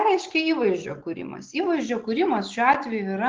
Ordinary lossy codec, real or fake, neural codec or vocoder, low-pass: Opus, 16 kbps; real; none; 7.2 kHz